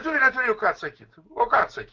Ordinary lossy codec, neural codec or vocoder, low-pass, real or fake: Opus, 24 kbps; codec, 16 kHz, 6 kbps, DAC; 7.2 kHz; fake